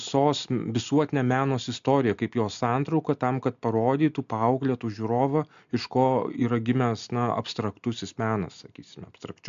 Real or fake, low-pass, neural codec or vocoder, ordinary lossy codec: real; 7.2 kHz; none; MP3, 64 kbps